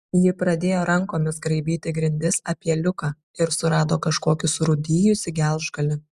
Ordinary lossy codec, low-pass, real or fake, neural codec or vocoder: Opus, 64 kbps; 14.4 kHz; real; none